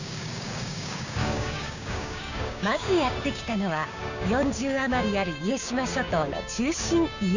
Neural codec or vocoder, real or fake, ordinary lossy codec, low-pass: codec, 16 kHz, 6 kbps, DAC; fake; none; 7.2 kHz